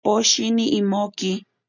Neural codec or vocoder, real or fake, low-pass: none; real; 7.2 kHz